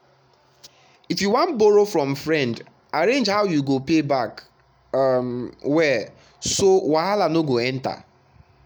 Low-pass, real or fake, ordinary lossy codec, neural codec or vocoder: none; real; none; none